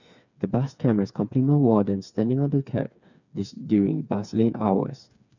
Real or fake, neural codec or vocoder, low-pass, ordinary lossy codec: fake; codec, 16 kHz, 4 kbps, FreqCodec, smaller model; 7.2 kHz; none